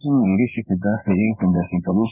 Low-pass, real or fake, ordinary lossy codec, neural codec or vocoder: 3.6 kHz; fake; none; codec, 16 kHz in and 24 kHz out, 1 kbps, XY-Tokenizer